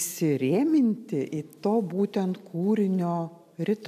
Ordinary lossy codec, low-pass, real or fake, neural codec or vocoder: MP3, 96 kbps; 14.4 kHz; real; none